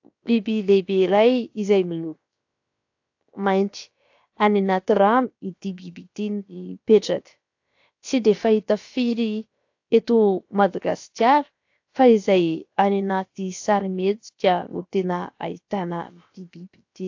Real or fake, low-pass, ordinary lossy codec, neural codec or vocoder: fake; 7.2 kHz; AAC, 48 kbps; codec, 16 kHz, about 1 kbps, DyCAST, with the encoder's durations